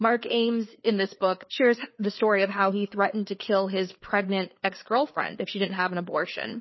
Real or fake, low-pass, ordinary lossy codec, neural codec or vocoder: fake; 7.2 kHz; MP3, 24 kbps; codec, 16 kHz, 4 kbps, FreqCodec, larger model